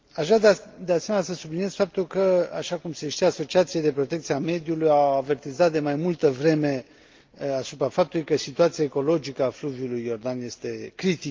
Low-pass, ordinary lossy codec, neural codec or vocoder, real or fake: 7.2 kHz; Opus, 32 kbps; none; real